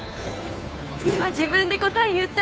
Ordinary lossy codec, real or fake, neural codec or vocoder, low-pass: none; fake; codec, 16 kHz, 2 kbps, FunCodec, trained on Chinese and English, 25 frames a second; none